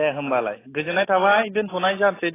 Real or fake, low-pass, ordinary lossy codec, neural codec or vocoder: real; 3.6 kHz; AAC, 16 kbps; none